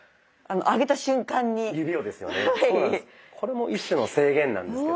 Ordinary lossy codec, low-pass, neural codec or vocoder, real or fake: none; none; none; real